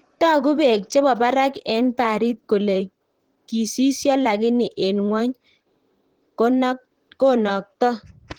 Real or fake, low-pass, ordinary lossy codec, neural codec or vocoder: fake; 19.8 kHz; Opus, 16 kbps; vocoder, 44.1 kHz, 128 mel bands, Pupu-Vocoder